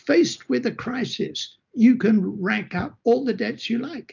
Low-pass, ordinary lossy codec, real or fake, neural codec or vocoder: 7.2 kHz; MP3, 64 kbps; real; none